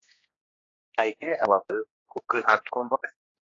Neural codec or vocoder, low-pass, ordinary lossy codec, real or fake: codec, 16 kHz, 2 kbps, X-Codec, HuBERT features, trained on general audio; 7.2 kHz; AAC, 32 kbps; fake